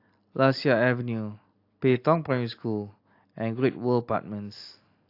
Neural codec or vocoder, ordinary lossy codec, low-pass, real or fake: none; AAC, 32 kbps; 5.4 kHz; real